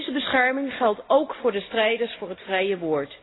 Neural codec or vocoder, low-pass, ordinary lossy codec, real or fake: none; 7.2 kHz; AAC, 16 kbps; real